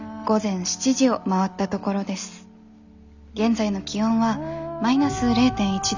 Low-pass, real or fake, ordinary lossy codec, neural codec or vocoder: 7.2 kHz; real; none; none